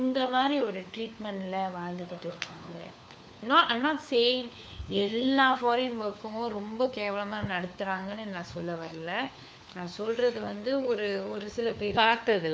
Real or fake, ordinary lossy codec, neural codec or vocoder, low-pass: fake; none; codec, 16 kHz, 4 kbps, FunCodec, trained on LibriTTS, 50 frames a second; none